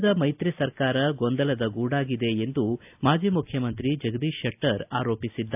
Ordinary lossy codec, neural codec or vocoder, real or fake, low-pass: AAC, 32 kbps; none; real; 3.6 kHz